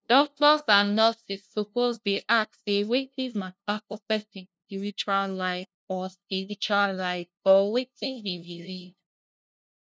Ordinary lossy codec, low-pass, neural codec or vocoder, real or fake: none; none; codec, 16 kHz, 0.5 kbps, FunCodec, trained on LibriTTS, 25 frames a second; fake